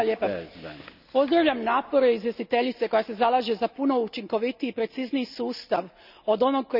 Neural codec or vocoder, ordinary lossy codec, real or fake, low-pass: none; none; real; 5.4 kHz